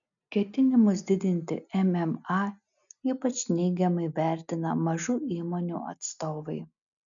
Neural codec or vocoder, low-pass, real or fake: none; 7.2 kHz; real